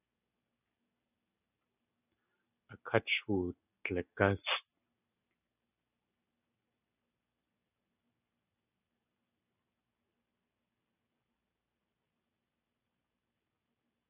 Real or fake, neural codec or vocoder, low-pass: real; none; 3.6 kHz